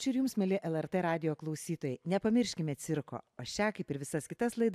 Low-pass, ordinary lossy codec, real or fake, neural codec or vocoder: 14.4 kHz; AAC, 96 kbps; real; none